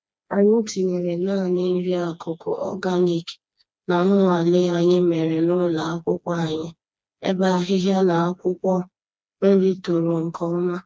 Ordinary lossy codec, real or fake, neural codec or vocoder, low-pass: none; fake; codec, 16 kHz, 2 kbps, FreqCodec, smaller model; none